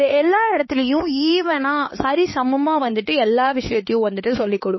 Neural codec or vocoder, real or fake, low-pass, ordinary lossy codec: codec, 16 kHz, 4 kbps, X-Codec, HuBERT features, trained on LibriSpeech; fake; 7.2 kHz; MP3, 24 kbps